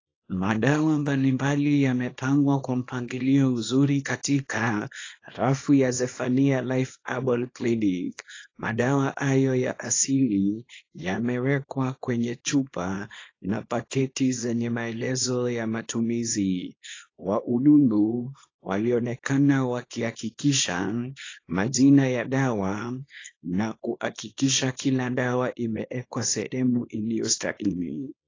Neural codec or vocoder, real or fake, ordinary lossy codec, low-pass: codec, 24 kHz, 0.9 kbps, WavTokenizer, small release; fake; AAC, 32 kbps; 7.2 kHz